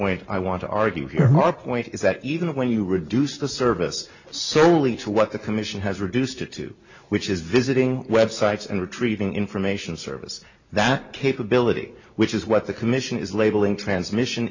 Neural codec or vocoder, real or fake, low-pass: none; real; 7.2 kHz